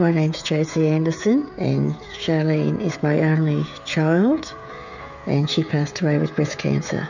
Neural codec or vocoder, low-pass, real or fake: codec, 16 kHz, 16 kbps, FreqCodec, smaller model; 7.2 kHz; fake